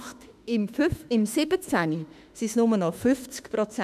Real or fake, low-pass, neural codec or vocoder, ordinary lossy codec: fake; 14.4 kHz; autoencoder, 48 kHz, 32 numbers a frame, DAC-VAE, trained on Japanese speech; none